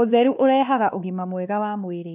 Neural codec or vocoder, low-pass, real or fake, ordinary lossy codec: codec, 16 kHz, 2 kbps, X-Codec, WavLM features, trained on Multilingual LibriSpeech; 3.6 kHz; fake; none